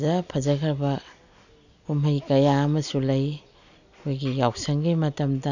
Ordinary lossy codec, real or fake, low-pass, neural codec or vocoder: none; real; 7.2 kHz; none